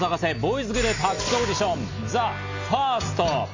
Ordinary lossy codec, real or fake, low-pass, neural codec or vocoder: AAC, 48 kbps; real; 7.2 kHz; none